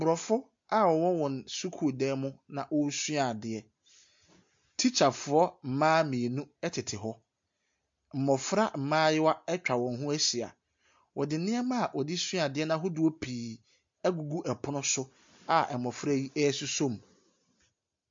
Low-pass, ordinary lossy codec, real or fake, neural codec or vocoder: 7.2 kHz; MP3, 48 kbps; real; none